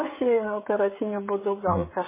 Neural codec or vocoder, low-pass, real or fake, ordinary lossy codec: codec, 16 kHz, 16 kbps, FreqCodec, smaller model; 3.6 kHz; fake; MP3, 16 kbps